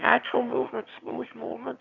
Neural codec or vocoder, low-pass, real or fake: autoencoder, 22.05 kHz, a latent of 192 numbers a frame, VITS, trained on one speaker; 7.2 kHz; fake